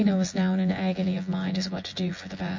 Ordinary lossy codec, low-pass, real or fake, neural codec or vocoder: MP3, 48 kbps; 7.2 kHz; fake; vocoder, 24 kHz, 100 mel bands, Vocos